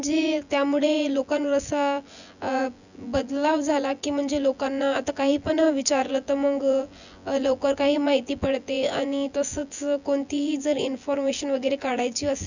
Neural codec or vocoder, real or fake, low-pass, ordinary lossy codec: vocoder, 24 kHz, 100 mel bands, Vocos; fake; 7.2 kHz; none